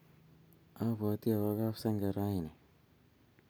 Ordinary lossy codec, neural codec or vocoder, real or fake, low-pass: none; none; real; none